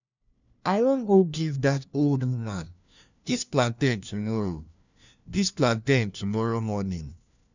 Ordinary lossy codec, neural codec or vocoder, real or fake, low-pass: none; codec, 16 kHz, 1 kbps, FunCodec, trained on LibriTTS, 50 frames a second; fake; 7.2 kHz